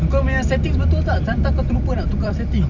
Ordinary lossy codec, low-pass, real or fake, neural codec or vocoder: none; 7.2 kHz; fake; vocoder, 44.1 kHz, 128 mel bands every 256 samples, BigVGAN v2